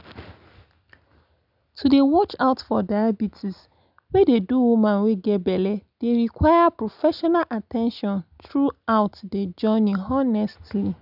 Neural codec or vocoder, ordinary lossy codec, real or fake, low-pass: none; none; real; 5.4 kHz